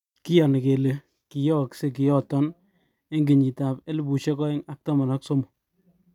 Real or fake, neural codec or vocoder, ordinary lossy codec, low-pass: real; none; none; 19.8 kHz